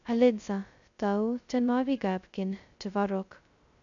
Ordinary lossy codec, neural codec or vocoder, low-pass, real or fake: none; codec, 16 kHz, 0.2 kbps, FocalCodec; 7.2 kHz; fake